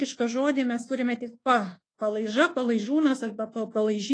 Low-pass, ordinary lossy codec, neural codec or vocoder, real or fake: 9.9 kHz; AAC, 32 kbps; codec, 24 kHz, 1.2 kbps, DualCodec; fake